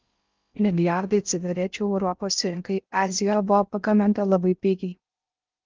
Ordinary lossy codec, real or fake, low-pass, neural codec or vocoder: Opus, 24 kbps; fake; 7.2 kHz; codec, 16 kHz in and 24 kHz out, 0.6 kbps, FocalCodec, streaming, 4096 codes